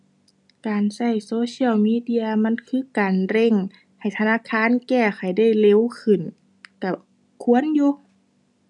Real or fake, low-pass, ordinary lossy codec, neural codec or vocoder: real; 10.8 kHz; none; none